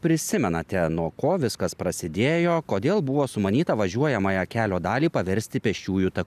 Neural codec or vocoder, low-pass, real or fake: none; 14.4 kHz; real